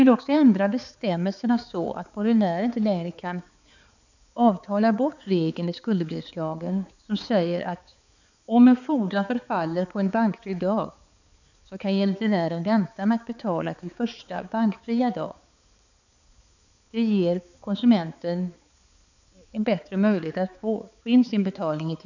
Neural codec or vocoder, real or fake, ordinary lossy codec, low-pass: codec, 16 kHz, 4 kbps, X-Codec, HuBERT features, trained on balanced general audio; fake; none; 7.2 kHz